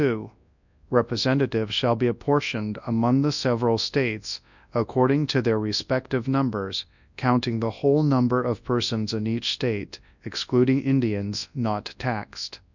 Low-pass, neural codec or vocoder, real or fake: 7.2 kHz; codec, 24 kHz, 0.9 kbps, WavTokenizer, large speech release; fake